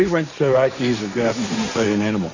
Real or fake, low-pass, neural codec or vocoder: fake; 7.2 kHz; codec, 16 kHz, 1.1 kbps, Voila-Tokenizer